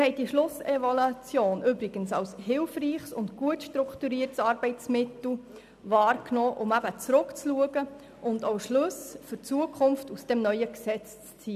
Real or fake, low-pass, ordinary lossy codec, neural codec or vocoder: real; 14.4 kHz; none; none